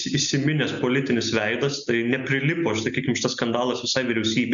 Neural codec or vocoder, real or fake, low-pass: none; real; 7.2 kHz